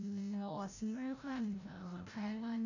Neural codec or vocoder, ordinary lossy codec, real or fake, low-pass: codec, 16 kHz, 0.5 kbps, FreqCodec, larger model; none; fake; 7.2 kHz